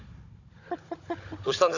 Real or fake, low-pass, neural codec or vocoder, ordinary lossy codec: fake; 7.2 kHz; codec, 16 kHz, 16 kbps, FunCodec, trained on Chinese and English, 50 frames a second; AAC, 48 kbps